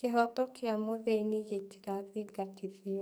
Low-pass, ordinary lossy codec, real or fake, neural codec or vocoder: none; none; fake; codec, 44.1 kHz, 2.6 kbps, SNAC